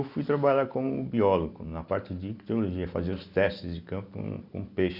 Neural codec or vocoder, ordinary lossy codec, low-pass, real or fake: none; none; 5.4 kHz; real